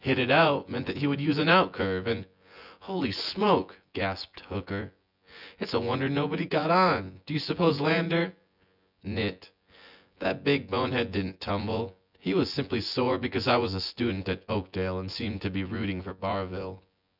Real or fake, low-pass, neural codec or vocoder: fake; 5.4 kHz; vocoder, 24 kHz, 100 mel bands, Vocos